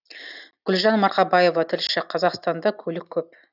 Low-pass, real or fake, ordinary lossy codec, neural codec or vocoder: 5.4 kHz; real; none; none